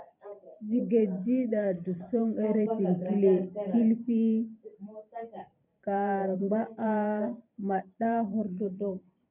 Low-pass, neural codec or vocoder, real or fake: 3.6 kHz; vocoder, 44.1 kHz, 128 mel bands every 512 samples, BigVGAN v2; fake